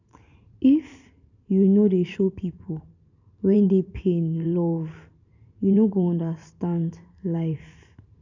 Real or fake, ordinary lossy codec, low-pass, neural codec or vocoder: fake; none; 7.2 kHz; vocoder, 44.1 kHz, 128 mel bands every 512 samples, BigVGAN v2